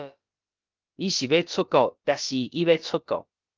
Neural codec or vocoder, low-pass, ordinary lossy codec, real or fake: codec, 16 kHz, about 1 kbps, DyCAST, with the encoder's durations; 7.2 kHz; Opus, 32 kbps; fake